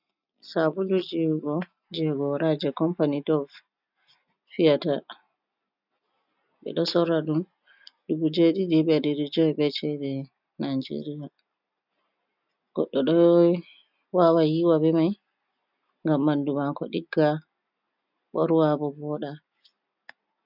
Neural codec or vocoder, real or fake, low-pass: none; real; 5.4 kHz